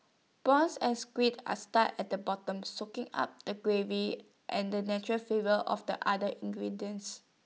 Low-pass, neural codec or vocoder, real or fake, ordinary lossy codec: none; none; real; none